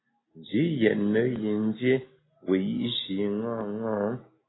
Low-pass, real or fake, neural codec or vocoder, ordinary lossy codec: 7.2 kHz; real; none; AAC, 16 kbps